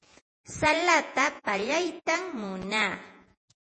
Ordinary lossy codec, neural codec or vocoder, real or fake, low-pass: MP3, 32 kbps; vocoder, 48 kHz, 128 mel bands, Vocos; fake; 10.8 kHz